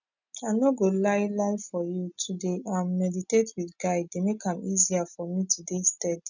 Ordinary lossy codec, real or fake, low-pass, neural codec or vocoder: none; real; 7.2 kHz; none